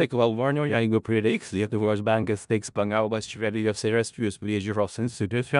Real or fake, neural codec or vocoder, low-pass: fake; codec, 16 kHz in and 24 kHz out, 0.4 kbps, LongCat-Audio-Codec, four codebook decoder; 10.8 kHz